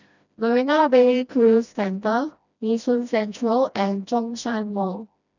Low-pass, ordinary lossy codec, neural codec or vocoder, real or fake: 7.2 kHz; none; codec, 16 kHz, 1 kbps, FreqCodec, smaller model; fake